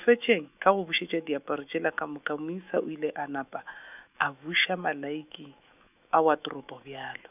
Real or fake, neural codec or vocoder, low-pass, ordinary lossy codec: fake; autoencoder, 48 kHz, 128 numbers a frame, DAC-VAE, trained on Japanese speech; 3.6 kHz; none